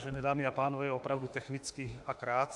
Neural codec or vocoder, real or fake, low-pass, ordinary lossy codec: autoencoder, 48 kHz, 32 numbers a frame, DAC-VAE, trained on Japanese speech; fake; 10.8 kHz; AAC, 64 kbps